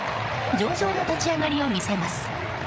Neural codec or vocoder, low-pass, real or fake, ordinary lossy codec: codec, 16 kHz, 8 kbps, FreqCodec, larger model; none; fake; none